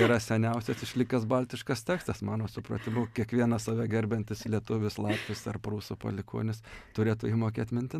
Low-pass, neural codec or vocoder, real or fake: 14.4 kHz; none; real